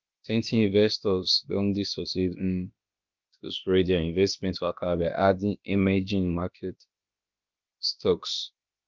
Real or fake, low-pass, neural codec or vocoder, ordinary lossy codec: fake; 7.2 kHz; codec, 16 kHz, about 1 kbps, DyCAST, with the encoder's durations; Opus, 24 kbps